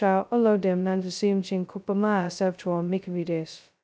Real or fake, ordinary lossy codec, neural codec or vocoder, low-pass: fake; none; codec, 16 kHz, 0.2 kbps, FocalCodec; none